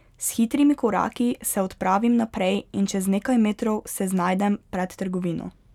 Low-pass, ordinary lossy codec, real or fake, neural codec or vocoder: 19.8 kHz; none; real; none